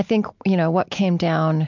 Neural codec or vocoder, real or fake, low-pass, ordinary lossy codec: none; real; 7.2 kHz; MP3, 64 kbps